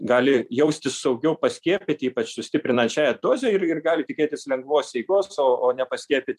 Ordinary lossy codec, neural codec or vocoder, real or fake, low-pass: MP3, 96 kbps; vocoder, 44.1 kHz, 128 mel bands every 256 samples, BigVGAN v2; fake; 14.4 kHz